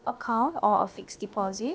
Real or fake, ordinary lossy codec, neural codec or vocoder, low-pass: fake; none; codec, 16 kHz, about 1 kbps, DyCAST, with the encoder's durations; none